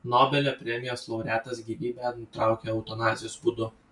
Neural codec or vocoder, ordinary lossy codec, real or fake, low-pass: none; MP3, 64 kbps; real; 10.8 kHz